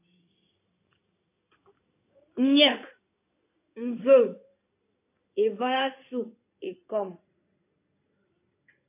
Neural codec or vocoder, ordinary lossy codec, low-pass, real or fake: codec, 16 kHz in and 24 kHz out, 2.2 kbps, FireRedTTS-2 codec; AAC, 24 kbps; 3.6 kHz; fake